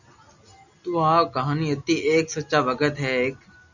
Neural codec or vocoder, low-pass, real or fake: none; 7.2 kHz; real